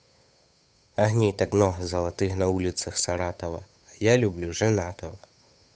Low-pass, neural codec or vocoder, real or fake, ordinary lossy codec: none; codec, 16 kHz, 8 kbps, FunCodec, trained on Chinese and English, 25 frames a second; fake; none